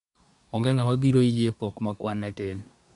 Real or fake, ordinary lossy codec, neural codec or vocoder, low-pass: fake; MP3, 96 kbps; codec, 24 kHz, 1 kbps, SNAC; 10.8 kHz